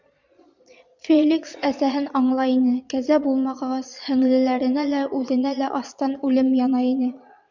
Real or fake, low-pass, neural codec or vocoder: fake; 7.2 kHz; vocoder, 22.05 kHz, 80 mel bands, Vocos